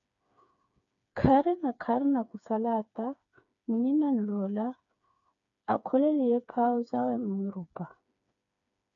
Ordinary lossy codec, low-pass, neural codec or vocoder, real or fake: MP3, 96 kbps; 7.2 kHz; codec, 16 kHz, 4 kbps, FreqCodec, smaller model; fake